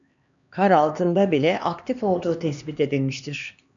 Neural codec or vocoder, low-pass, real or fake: codec, 16 kHz, 2 kbps, X-Codec, HuBERT features, trained on LibriSpeech; 7.2 kHz; fake